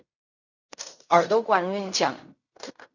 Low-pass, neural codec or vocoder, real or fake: 7.2 kHz; codec, 16 kHz in and 24 kHz out, 0.4 kbps, LongCat-Audio-Codec, fine tuned four codebook decoder; fake